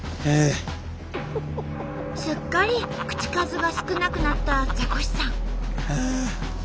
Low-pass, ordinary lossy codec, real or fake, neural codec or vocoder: none; none; real; none